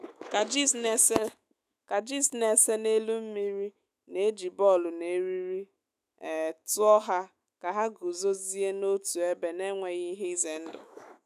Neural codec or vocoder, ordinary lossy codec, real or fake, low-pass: autoencoder, 48 kHz, 128 numbers a frame, DAC-VAE, trained on Japanese speech; none; fake; 14.4 kHz